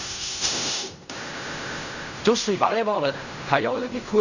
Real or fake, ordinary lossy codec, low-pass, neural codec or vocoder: fake; none; 7.2 kHz; codec, 16 kHz in and 24 kHz out, 0.4 kbps, LongCat-Audio-Codec, fine tuned four codebook decoder